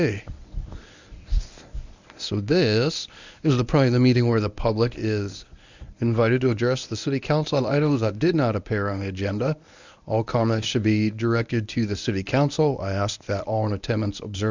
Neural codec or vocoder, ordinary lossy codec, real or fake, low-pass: codec, 24 kHz, 0.9 kbps, WavTokenizer, medium speech release version 1; Opus, 64 kbps; fake; 7.2 kHz